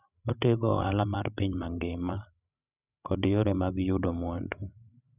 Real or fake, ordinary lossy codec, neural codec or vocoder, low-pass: fake; none; codec, 16 kHz, 16 kbps, FreqCodec, larger model; 3.6 kHz